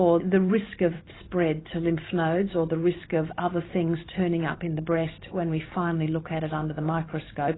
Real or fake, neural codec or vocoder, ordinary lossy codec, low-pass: fake; vocoder, 44.1 kHz, 128 mel bands every 512 samples, BigVGAN v2; AAC, 16 kbps; 7.2 kHz